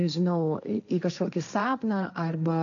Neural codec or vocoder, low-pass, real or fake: codec, 16 kHz, 1.1 kbps, Voila-Tokenizer; 7.2 kHz; fake